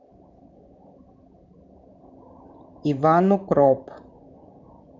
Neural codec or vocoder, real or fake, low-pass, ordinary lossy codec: vocoder, 44.1 kHz, 128 mel bands, Pupu-Vocoder; fake; 7.2 kHz; none